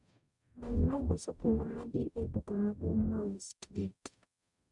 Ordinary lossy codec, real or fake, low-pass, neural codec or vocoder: none; fake; 10.8 kHz; codec, 44.1 kHz, 0.9 kbps, DAC